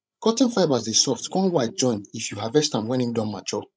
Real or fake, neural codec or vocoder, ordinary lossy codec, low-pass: fake; codec, 16 kHz, 16 kbps, FreqCodec, larger model; none; none